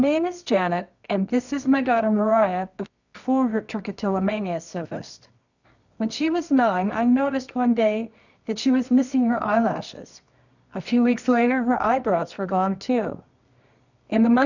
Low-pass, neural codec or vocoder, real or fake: 7.2 kHz; codec, 24 kHz, 0.9 kbps, WavTokenizer, medium music audio release; fake